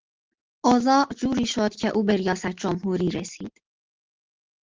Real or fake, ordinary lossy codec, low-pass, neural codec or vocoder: real; Opus, 16 kbps; 7.2 kHz; none